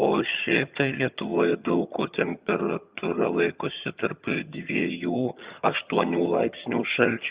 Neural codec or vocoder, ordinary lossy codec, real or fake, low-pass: vocoder, 22.05 kHz, 80 mel bands, HiFi-GAN; Opus, 64 kbps; fake; 3.6 kHz